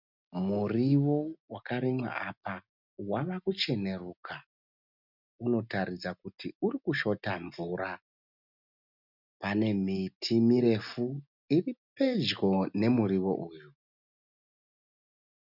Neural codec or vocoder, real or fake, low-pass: none; real; 5.4 kHz